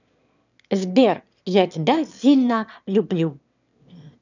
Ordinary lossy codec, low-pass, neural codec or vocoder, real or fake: none; 7.2 kHz; autoencoder, 22.05 kHz, a latent of 192 numbers a frame, VITS, trained on one speaker; fake